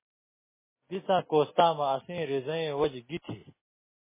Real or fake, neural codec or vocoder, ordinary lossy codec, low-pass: real; none; MP3, 16 kbps; 3.6 kHz